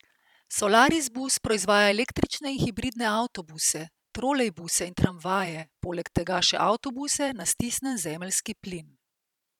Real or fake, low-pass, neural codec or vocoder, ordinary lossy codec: fake; 19.8 kHz; vocoder, 44.1 kHz, 128 mel bands every 512 samples, BigVGAN v2; none